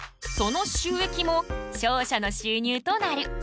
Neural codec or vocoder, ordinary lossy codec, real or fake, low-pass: none; none; real; none